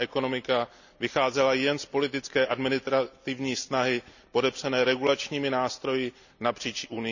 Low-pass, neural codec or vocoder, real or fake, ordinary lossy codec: 7.2 kHz; none; real; none